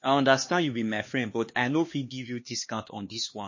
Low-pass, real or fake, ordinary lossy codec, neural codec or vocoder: 7.2 kHz; fake; MP3, 32 kbps; codec, 16 kHz, 2 kbps, X-Codec, HuBERT features, trained on LibriSpeech